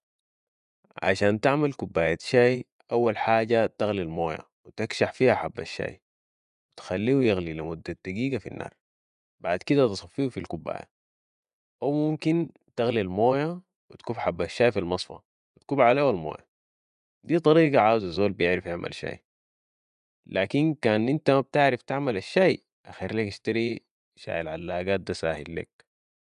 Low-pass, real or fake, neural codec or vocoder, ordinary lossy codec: 10.8 kHz; fake; vocoder, 24 kHz, 100 mel bands, Vocos; none